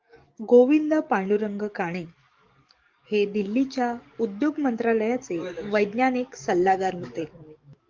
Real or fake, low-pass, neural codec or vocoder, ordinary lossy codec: fake; 7.2 kHz; codec, 44.1 kHz, 7.8 kbps, DAC; Opus, 32 kbps